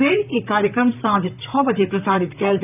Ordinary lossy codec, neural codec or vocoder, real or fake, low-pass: none; vocoder, 44.1 kHz, 128 mel bands, Pupu-Vocoder; fake; 3.6 kHz